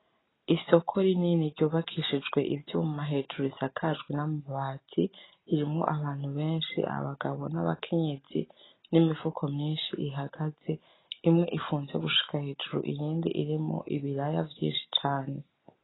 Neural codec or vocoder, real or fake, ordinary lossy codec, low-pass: none; real; AAC, 16 kbps; 7.2 kHz